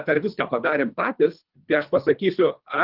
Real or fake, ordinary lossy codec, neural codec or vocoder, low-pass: fake; Opus, 32 kbps; codec, 24 kHz, 3 kbps, HILCodec; 5.4 kHz